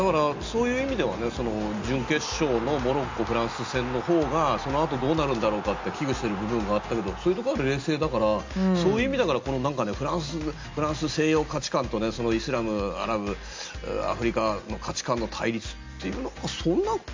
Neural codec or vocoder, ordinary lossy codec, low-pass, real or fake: none; MP3, 64 kbps; 7.2 kHz; real